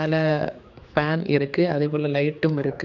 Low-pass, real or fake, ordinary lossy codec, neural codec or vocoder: 7.2 kHz; fake; none; codec, 16 kHz, 4 kbps, X-Codec, HuBERT features, trained on general audio